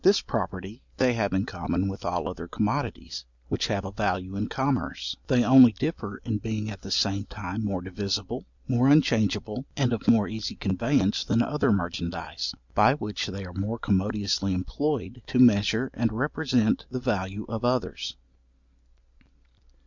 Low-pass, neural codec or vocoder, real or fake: 7.2 kHz; none; real